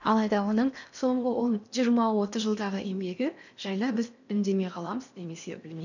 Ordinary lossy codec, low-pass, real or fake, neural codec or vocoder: none; 7.2 kHz; fake; codec, 16 kHz in and 24 kHz out, 0.8 kbps, FocalCodec, streaming, 65536 codes